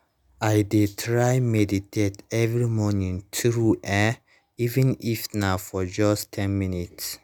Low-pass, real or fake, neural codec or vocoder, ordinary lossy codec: none; real; none; none